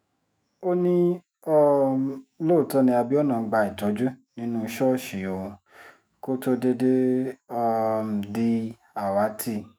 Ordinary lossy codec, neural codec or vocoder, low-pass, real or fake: none; autoencoder, 48 kHz, 128 numbers a frame, DAC-VAE, trained on Japanese speech; none; fake